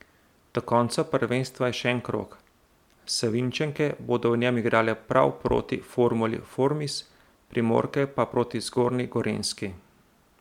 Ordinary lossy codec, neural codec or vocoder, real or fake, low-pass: MP3, 96 kbps; none; real; 19.8 kHz